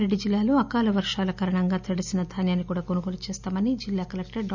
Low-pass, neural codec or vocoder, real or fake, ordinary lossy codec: 7.2 kHz; none; real; none